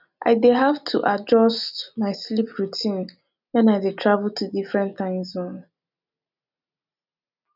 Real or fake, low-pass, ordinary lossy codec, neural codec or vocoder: real; 5.4 kHz; none; none